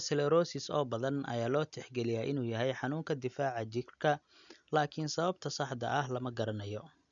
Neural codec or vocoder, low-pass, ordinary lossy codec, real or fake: none; 7.2 kHz; none; real